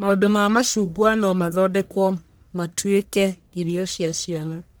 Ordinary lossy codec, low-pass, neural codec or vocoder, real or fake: none; none; codec, 44.1 kHz, 1.7 kbps, Pupu-Codec; fake